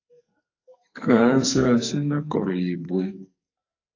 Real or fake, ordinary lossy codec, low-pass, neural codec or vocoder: fake; AAC, 48 kbps; 7.2 kHz; codec, 44.1 kHz, 2.6 kbps, SNAC